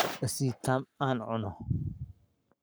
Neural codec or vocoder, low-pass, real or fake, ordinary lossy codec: codec, 44.1 kHz, 7.8 kbps, Pupu-Codec; none; fake; none